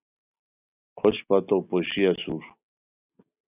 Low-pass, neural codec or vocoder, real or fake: 3.6 kHz; none; real